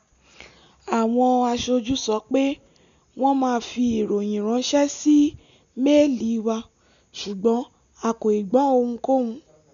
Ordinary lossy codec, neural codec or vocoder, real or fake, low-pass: none; none; real; 7.2 kHz